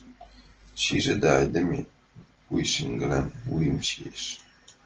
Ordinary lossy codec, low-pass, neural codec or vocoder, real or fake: Opus, 16 kbps; 7.2 kHz; none; real